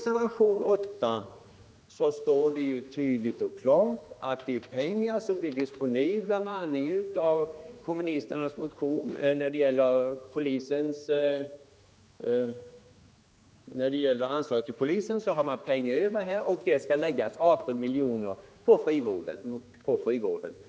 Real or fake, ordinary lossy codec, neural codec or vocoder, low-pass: fake; none; codec, 16 kHz, 2 kbps, X-Codec, HuBERT features, trained on general audio; none